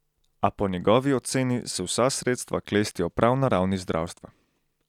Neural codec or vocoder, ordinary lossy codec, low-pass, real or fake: none; none; 19.8 kHz; real